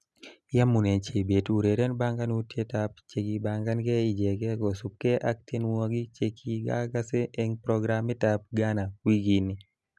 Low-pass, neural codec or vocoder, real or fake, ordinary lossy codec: none; none; real; none